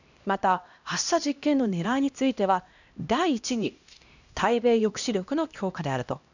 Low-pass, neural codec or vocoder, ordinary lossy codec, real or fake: 7.2 kHz; codec, 16 kHz, 1 kbps, X-Codec, HuBERT features, trained on LibriSpeech; none; fake